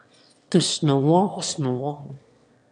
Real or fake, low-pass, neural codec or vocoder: fake; 9.9 kHz; autoencoder, 22.05 kHz, a latent of 192 numbers a frame, VITS, trained on one speaker